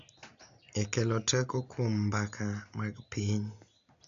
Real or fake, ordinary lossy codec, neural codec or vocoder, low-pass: real; AAC, 64 kbps; none; 7.2 kHz